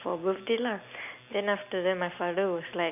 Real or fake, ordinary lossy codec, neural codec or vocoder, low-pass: real; none; none; 3.6 kHz